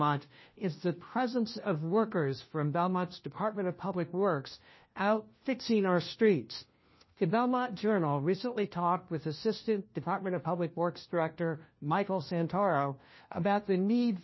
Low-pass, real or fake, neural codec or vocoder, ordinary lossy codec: 7.2 kHz; fake; codec, 16 kHz, 1 kbps, FunCodec, trained on LibriTTS, 50 frames a second; MP3, 24 kbps